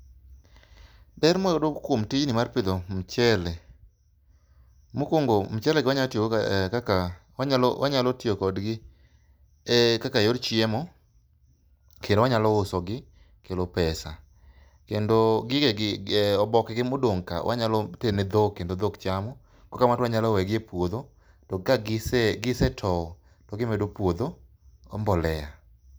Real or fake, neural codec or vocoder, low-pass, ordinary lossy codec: real; none; none; none